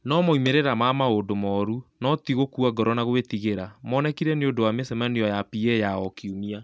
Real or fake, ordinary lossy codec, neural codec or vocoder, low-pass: real; none; none; none